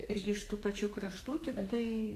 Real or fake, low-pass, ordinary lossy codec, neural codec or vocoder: fake; 14.4 kHz; AAC, 64 kbps; codec, 32 kHz, 1.9 kbps, SNAC